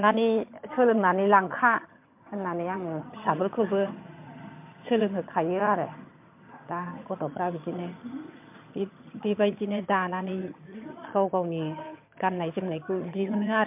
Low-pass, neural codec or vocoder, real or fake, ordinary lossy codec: 3.6 kHz; vocoder, 22.05 kHz, 80 mel bands, HiFi-GAN; fake; MP3, 32 kbps